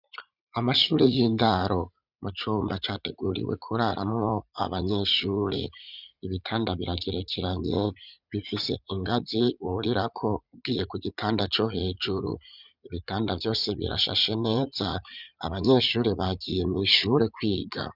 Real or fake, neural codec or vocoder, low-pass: fake; vocoder, 44.1 kHz, 80 mel bands, Vocos; 5.4 kHz